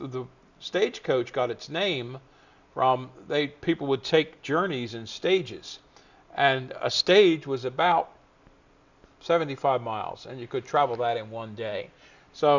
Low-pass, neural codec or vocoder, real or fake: 7.2 kHz; none; real